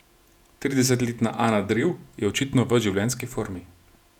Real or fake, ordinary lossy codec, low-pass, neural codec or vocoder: fake; none; 19.8 kHz; vocoder, 48 kHz, 128 mel bands, Vocos